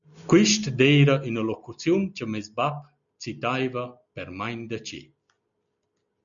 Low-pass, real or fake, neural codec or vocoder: 7.2 kHz; real; none